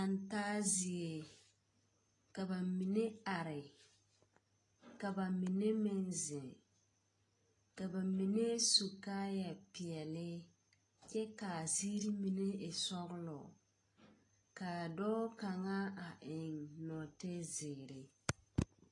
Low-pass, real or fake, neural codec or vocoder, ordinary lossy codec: 10.8 kHz; real; none; AAC, 32 kbps